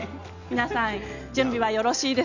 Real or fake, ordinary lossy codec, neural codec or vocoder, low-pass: real; none; none; 7.2 kHz